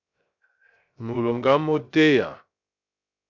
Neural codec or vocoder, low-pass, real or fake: codec, 16 kHz, 0.3 kbps, FocalCodec; 7.2 kHz; fake